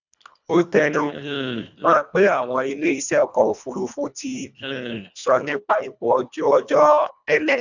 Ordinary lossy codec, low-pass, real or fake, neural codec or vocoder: none; 7.2 kHz; fake; codec, 24 kHz, 1.5 kbps, HILCodec